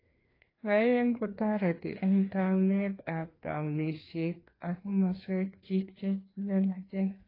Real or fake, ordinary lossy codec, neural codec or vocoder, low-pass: fake; AAC, 24 kbps; codec, 16 kHz, 2 kbps, FreqCodec, larger model; 5.4 kHz